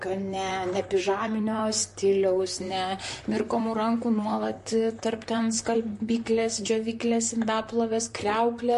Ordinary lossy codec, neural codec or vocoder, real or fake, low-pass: MP3, 48 kbps; vocoder, 44.1 kHz, 128 mel bands, Pupu-Vocoder; fake; 14.4 kHz